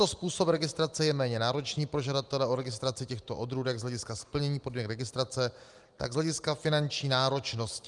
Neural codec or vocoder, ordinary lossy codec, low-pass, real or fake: none; Opus, 32 kbps; 10.8 kHz; real